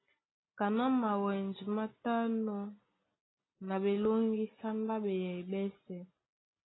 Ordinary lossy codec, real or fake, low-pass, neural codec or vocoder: AAC, 16 kbps; real; 7.2 kHz; none